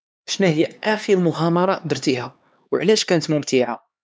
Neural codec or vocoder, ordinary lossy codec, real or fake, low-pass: codec, 16 kHz, 2 kbps, X-Codec, HuBERT features, trained on LibriSpeech; none; fake; none